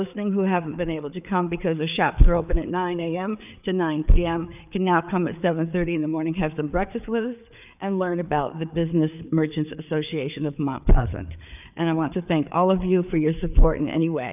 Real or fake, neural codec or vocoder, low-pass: fake; codec, 16 kHz, 4 kbps, FreqCodec, larger model; 3.6 kHz